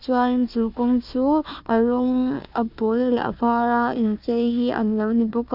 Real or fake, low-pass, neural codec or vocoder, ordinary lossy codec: fake; 5.4 kHz; codec, 16 kHz, 1 kbps, FunCodec, trained on Chinese and English, 50 frames a second; none